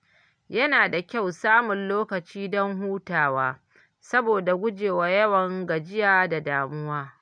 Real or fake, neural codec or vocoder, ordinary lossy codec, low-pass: real; none; none; 9.9 kHz